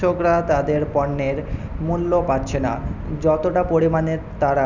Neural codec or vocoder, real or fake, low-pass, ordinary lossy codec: none; real; 7.2 kHz; none